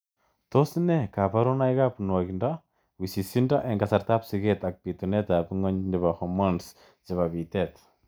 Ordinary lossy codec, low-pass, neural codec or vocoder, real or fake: none; none; none; real